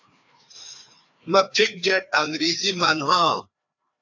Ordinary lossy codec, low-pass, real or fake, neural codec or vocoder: AAC, 48 kbps; 7.2 kHz; fake; codec, 16 kHz, 2 kbps, FreqCodec, larger model